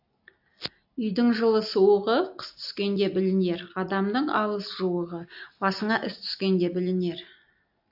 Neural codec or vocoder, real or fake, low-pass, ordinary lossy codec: none; real; 5.4 kHz; AAC, 48 kbps